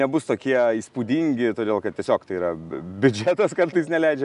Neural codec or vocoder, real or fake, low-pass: none; real; 10.8 kHz